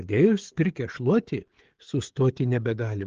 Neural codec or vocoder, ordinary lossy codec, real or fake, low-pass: codec, 16 kHz, 16 kbps, FreqCodec, smaller model; Opus, 16 kbps; fake; 7.2 kHz